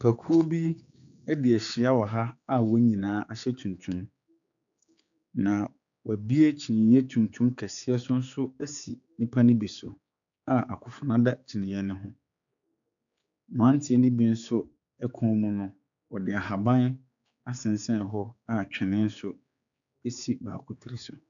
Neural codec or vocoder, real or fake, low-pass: codec, 16 kHz, 4 kbps, X-Codec, HuBERT features, trained on general audio; fake; 7.2 kHz